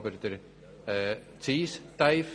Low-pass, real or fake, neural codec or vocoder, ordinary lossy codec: 9.9 kHz; real; none; none